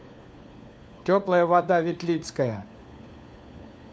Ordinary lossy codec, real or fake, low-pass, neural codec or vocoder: none; fake; none; codec, 16 kHz, 4 kbps, FunCodec, trained on LibriTTS, 50 frames a second